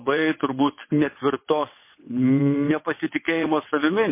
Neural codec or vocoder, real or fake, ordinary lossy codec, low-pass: vocoder, 22.05 kHz, 80 mel bands, WaveNeXt; fake; MP3, 24 kbps; 3.6 kHz